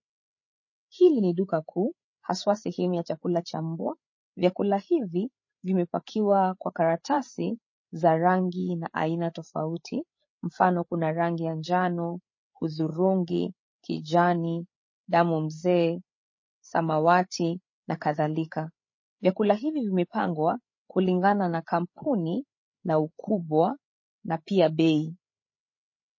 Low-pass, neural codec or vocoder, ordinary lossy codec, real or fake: 7.2 kHz; codec, 16 kHz, 8 kbps, FreqCodec, larger model; MP3, 32 kbps; fake